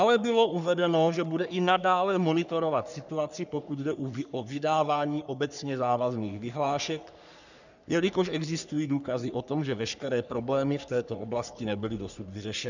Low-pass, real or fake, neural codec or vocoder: 7.2 kHz; fake; codec, 44.1 kHz, 3.4 kbps, Pupu-Codec